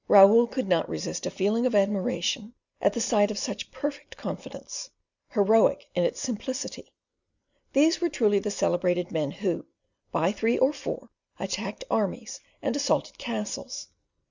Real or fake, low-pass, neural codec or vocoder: real; 7.2 kHz; none